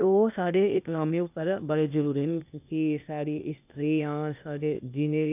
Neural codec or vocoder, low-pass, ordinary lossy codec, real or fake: codec, 16 kHz, 0.8 kbps, ZipCodec; 3.6 kHz; none; fake